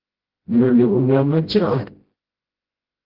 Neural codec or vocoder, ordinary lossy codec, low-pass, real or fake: codec, 16 kHz, 0.5 kbps, FreqCodec, smaller model; Opus, 16 kbps; 5.4 kHz; fake